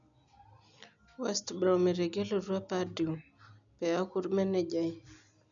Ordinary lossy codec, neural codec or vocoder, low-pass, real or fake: none; none; 7.2 kHz; real